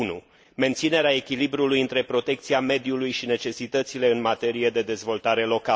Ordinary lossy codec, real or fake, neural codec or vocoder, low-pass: none; real; none; none